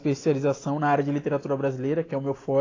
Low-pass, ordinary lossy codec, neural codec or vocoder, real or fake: 7.2 kHz; AAC, 32 kbps; none; real